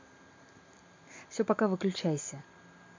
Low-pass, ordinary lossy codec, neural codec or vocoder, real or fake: 7.2 kHz; MP3, 64 kbps; none; real